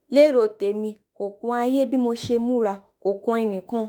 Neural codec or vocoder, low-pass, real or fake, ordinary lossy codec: autoencoder, 48 kHz, 32 numbers a frame, DAC-VAE, trained on Japanese speech; none; fake; none